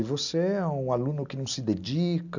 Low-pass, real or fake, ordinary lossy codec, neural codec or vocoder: 7.2 kHz; real; none; none